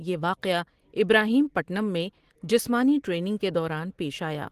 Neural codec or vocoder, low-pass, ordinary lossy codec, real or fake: none; 14.4 kHz; Opus, 16 kbps; real